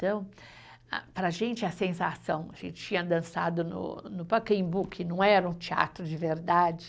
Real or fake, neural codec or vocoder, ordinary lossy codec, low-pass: real; none; none; none